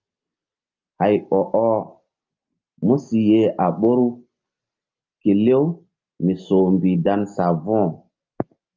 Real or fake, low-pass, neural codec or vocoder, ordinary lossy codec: real; 7.2 kHz; none; Opus, 24 kbps